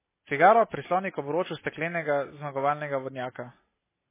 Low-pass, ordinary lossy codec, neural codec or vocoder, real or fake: 3.6 kHz; MP3, 16 kbps; none; real